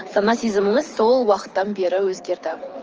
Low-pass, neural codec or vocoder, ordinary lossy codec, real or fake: 7.2 kHz; codec, 24 kHz, 6 kbps, HILCodec; Opus, 24 kbps; fake